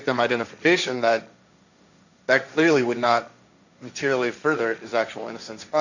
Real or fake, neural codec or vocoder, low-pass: fake; codec, 16 kHz, 1.1 kbps, Voila-Tokenizer; 7.2 kHz